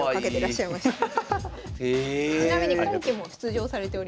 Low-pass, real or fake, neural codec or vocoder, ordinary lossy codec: none; real; none; none